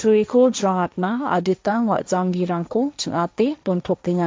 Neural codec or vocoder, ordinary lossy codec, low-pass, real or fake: codec, 16 kHz, 1.1 kbps, Voila-Tokenizer; none; none; fake